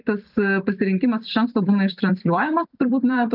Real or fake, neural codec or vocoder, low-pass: fake; vocoder, 44.1 kHz, 128 mel bands every 256 samples, BigVGAN v2; 5.4 kHz